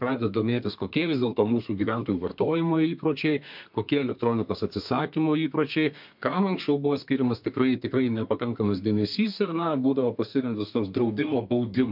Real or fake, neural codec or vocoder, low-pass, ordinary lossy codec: fake; codec, 32 kHz, 1.9 kbps, SNAC; 5.4 kHz; MP3, 48 kbps